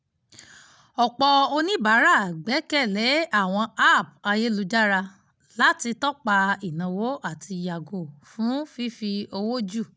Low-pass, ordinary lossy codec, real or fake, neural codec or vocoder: none; none; real; none